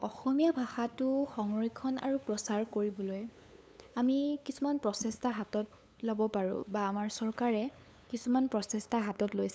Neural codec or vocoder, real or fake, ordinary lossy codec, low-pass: codec, 16 kHz, 8 kbps, FunCodec, trained on LibriTTS, 25 frames a second; fake; none; none